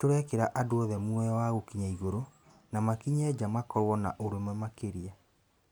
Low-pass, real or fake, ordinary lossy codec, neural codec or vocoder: none; real; none; none